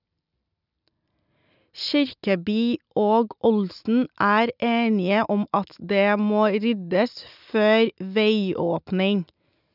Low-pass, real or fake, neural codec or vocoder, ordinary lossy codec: 5.4 kHz; real; none; none